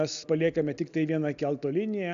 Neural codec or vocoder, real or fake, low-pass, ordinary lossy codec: none; real; 7.2 kHz; AAC, 96 kbps